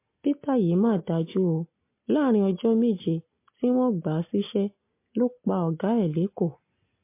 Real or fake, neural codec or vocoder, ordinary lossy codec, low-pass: real; none; MP3, 24 kbps; 3.6 kHz